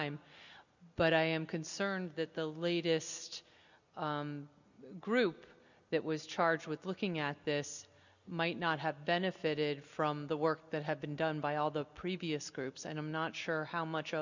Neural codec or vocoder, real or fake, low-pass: none; real; 7.2 kHz